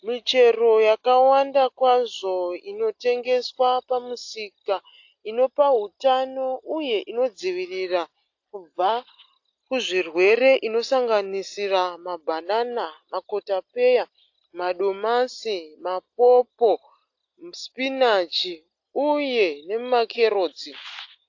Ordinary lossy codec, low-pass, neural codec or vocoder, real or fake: AAC, 48 kbps; 7.2 kHz; none; real